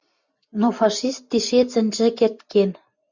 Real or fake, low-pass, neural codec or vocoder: real; 7.2 kHz; none